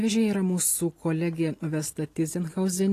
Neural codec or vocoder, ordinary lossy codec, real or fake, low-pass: none; AAC, 48 kbps; real; 14.4 kHz